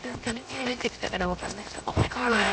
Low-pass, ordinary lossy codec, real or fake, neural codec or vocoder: none; none; fake; codec, 16 kHz, 0.7 kbps, FocalCodec